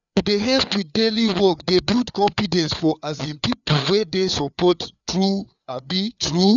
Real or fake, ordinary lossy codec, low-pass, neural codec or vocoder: fake; none; 7.2 kHz; codec, 16 kHz, 4 kbps, FreqCodec, larger model